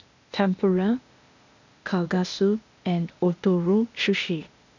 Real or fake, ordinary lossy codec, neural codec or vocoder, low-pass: fake; none; codec, 16 kHz, 0.8 kbps, ZipCodec; 7.2 kHz